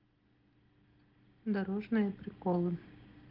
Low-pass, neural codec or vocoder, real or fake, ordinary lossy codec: 5.4 kHz; none; real; Opus, 16 kbps